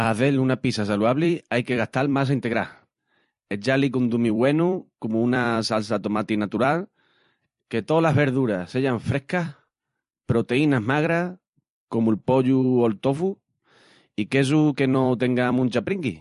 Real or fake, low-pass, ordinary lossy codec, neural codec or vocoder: fake; 14.4 kHz; MP3, 48 kbps; vocoder, 44.1 kHz, 128 mel bands every 256 samples, BigVGAN v2